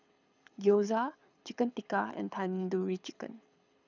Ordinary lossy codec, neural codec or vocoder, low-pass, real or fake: none; codec, 24 kHz, 6 kbps, HILCodec; 7.2 kHz; fake